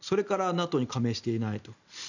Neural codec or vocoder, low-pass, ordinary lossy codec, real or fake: none; 7.2 kHz; none; real